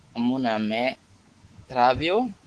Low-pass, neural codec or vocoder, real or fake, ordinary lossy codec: 10.8 kHz; codec, 24 kHz, 3.1 kbps, DualCodec; fake; Opus, 16 kbps